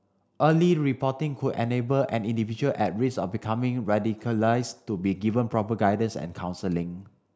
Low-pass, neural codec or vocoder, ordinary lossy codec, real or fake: none; none; none; real